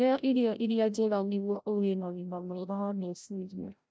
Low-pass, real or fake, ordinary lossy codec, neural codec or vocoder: none; fake; none; codec, 16 kHz, 0.5 kbps, FreqCodec, larger model